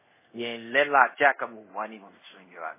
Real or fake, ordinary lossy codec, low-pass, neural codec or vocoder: fake; MP3, 16 kbps; 3.6 kHz; codec, 24 kHz, 0.5 kbps, DualCodec